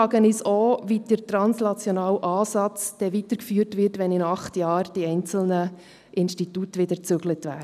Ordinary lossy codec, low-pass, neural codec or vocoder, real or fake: none; 14.4 kHz; none; real